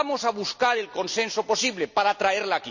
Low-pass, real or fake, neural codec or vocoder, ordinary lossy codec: 7.2 kHz; real; none; none